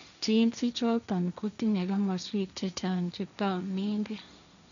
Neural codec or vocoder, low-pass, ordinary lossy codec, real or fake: codec, 16 kHz, 1.1 kbps, Voila-Tokenizer; 7.2 kHz; none; fake